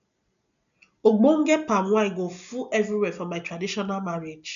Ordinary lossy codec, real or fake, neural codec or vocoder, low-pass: none; real; none; 7.2 kHz